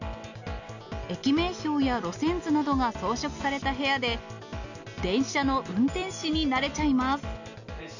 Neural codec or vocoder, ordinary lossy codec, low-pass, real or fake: none; none; 7.2 kHz; real